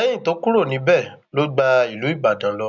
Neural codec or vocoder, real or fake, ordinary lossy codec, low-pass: none; real; none; 7.2 kHz